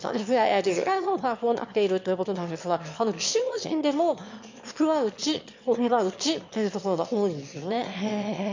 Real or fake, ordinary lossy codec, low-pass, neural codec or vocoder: fake; MP3, 48 kbps; 7.2 kHz; autoencoder, 22.05 kHz, a latent of 192 numbers a frame, VITS, trained on one speaker